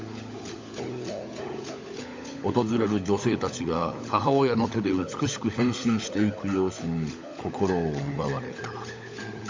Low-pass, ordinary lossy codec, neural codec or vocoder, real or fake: 7.2 kHz; MP3, 64 kbps; codec, 16 kHz, 16 kbps, FunCodec, trained on LibriTTS, 50 frames a second; fake